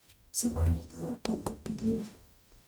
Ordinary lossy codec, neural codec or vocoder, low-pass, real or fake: none; codec, 44.1 kHz, 0.9 kbps, DAC; none; fake